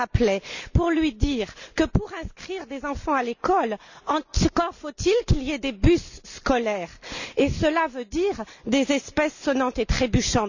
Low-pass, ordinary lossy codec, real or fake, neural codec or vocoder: 7.2 kHz; none; real; none